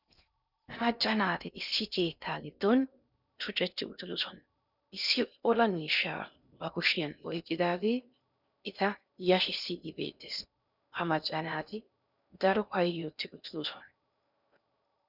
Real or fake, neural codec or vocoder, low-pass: fake; codec, 16 kHz in and 24 kHz out, 0.6 kbps, FocalCodec, streaming, 2048 codes; 5.4 kHz